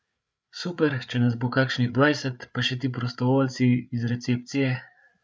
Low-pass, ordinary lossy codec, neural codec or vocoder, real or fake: none; none; codec, 16 kHz, 8 kbps, FreqCodec, larger model; fake